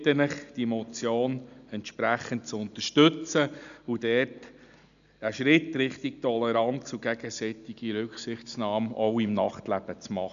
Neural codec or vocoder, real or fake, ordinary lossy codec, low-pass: none; real; none; 7.2 kHz